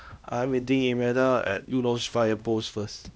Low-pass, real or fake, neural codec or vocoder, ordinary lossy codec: none; fake; codec, 16 kHz, 1 kbps, X-Codec, HuBERT features, trained on LibriSpeech; none